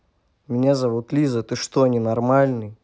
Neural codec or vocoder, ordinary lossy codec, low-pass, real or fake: none; none; none; real